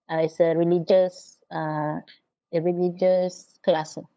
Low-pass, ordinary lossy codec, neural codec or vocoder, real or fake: none; none; codec, 16 kHz, 8 kbps, FunCodec, trained on LibriTTS, 25 frames a second; fake